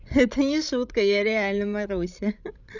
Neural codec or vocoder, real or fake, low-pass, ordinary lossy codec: codec, 16 kHz, 16 kbps, FreqCodec, larger model; fake; 7.2 kHz; none